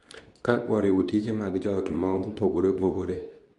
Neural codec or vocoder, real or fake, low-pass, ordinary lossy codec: codec, 24 kHz, 0.9 kbps, WavTokenizer, medium speech release version 2; fake; 10.8 kHz; none